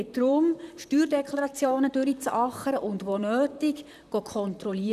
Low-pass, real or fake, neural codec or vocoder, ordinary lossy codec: 14.4 kHz; fake; vocoder, 44.1 kHz, 128 mel bands, Pupu-Vocoder; none